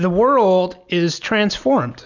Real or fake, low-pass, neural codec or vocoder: real; 7.2 kHz; none